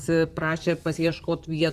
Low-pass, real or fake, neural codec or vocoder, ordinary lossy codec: 14.4 kHz; fake; vocoder, 44.1 kHz, 128 mel bands, Pupu-Vocoder; Opus, 64 kbps